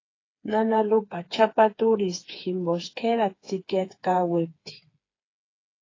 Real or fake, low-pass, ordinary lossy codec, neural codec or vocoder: fake; 7.2 kHz; AAC, 32 kbps; codec, 16 kHz, 4 kbps, FreqCodec, smaller model